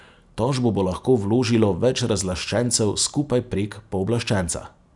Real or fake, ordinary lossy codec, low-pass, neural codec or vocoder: real; none; 10.8 kHz; none